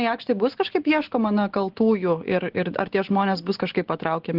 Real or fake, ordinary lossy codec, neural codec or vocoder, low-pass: real; Opus, 16 kbps; none; 5.4 kHz